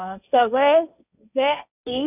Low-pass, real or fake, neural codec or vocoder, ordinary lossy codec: 3.6 kHz; fake; codec, 16 kHz, 1.1 kbps, Voila-Tokenizer; none